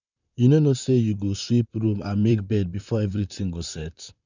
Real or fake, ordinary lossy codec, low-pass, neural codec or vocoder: fake; none; 7.2 kHz; vocoder, 44.1 kHz, 128 mel bands, Pupu-Vocoder